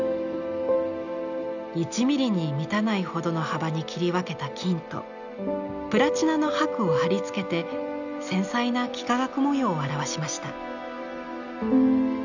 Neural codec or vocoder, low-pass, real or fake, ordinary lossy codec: none; 7.2 kHz; real; none